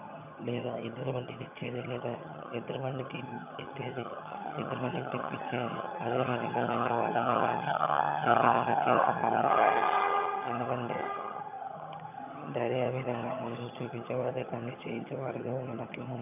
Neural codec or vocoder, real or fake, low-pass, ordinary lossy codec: vocoder, 22.05 kHz, 80 mel bands, HiFi-GAN; fake; 3.6 kHz; none